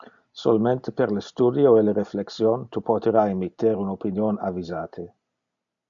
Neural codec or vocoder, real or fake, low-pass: none; real; 7.2 kHz